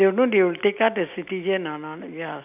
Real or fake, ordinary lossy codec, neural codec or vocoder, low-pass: real; none; none; 3.6 kHz